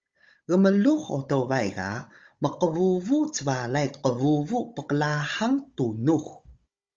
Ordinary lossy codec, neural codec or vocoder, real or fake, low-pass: Opus, 32 kbps; codec, 16 kHz, 16 kbps, FunCodec, trained on Chinese and English, 50 frames a second; fake; 7.2 kHz